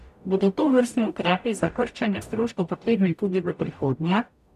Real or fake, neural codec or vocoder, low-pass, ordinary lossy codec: fake; codec, 44.1 kHz, 0.9 kbps, DAC; 14.4 kHz; none